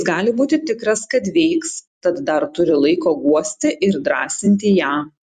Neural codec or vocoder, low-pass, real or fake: vocoder, 44.1 kHz, 128 mel bands every 256 samples, BigVGAN v2; 14.4 kHz; fake